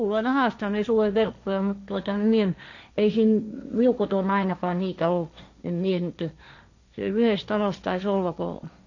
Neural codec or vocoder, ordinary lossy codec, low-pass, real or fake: codec, 16 kHz, 1.1 kbps, Voila-Tokenizer; none; 7.2 kHz; fake